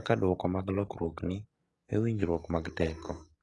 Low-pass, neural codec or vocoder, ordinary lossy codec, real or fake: 10.8 kHz; codec, 44.1 kHz, 7.8 kbps, DAC; AAC, 32 kbps; fake